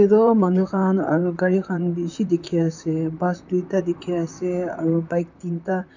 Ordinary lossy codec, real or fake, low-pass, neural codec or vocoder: none; fake; 7.2 kHz; vocoder, 44.1 kHz, 128 mel bands, Pupu-Vocoder